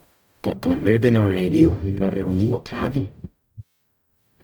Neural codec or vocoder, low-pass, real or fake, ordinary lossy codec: codec, 44.1 kHz, 0.9 kbps, DAC; none; fake; none